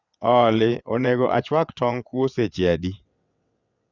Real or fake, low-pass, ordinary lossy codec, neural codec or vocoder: fake; 7.2 kHz; none; vocoder, 22.05 kHz, 80 mel bands, WaveNeXt